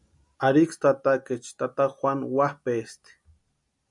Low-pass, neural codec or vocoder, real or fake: 10.8 kHz; none; real